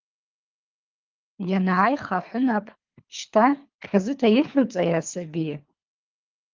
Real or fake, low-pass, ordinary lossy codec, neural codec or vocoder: fake; 7.2 kHz; Opus, 24 kbps; codec, 24 kHz, 3 kbps, HILCodec